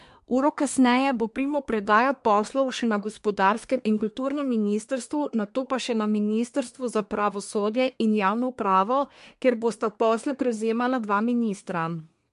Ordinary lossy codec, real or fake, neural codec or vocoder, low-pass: MP3, 64 kbps; fake; codec, 24 kHz, 1 kbps, SNAC; 10.8 kHz